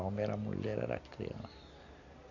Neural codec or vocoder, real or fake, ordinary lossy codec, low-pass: none; real; none; 7.2 kHz